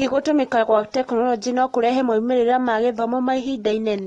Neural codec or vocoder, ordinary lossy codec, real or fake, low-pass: none; AAC, 32 kbps; real; 10.8 kHz